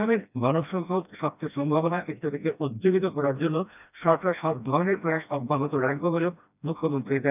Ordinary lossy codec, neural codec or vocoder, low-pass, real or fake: none; codec, 16 kHz, 1 kbps, FreqCodec, smaller model; 3.6 kHz; fake